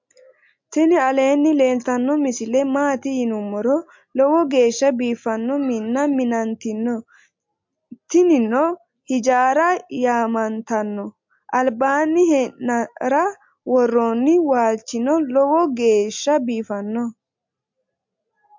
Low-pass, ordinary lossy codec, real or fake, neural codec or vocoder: 7.2 kHz; MP3, 48 kbps; real; none